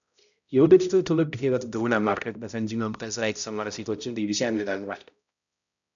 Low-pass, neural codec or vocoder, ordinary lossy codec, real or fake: 7.2 kHz; codec, 16 kHz, 0.5 kbps, X-Codec, HuBERT features, trained on balanced general audio; MP3, 96 kbps; fake